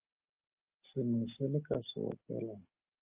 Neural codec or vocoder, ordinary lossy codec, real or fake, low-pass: none; Opus, 32 kbps; real; 3.6 kHz